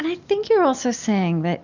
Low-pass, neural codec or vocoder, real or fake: 7.2 kHz; none; real